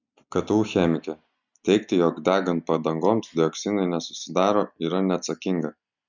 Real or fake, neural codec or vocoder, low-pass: real; none; 7.2 kHz